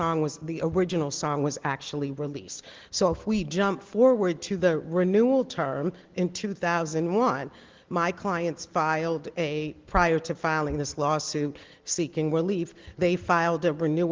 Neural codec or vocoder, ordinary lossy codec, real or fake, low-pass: none; Opus, 16 kbps; real; 7.2 kHz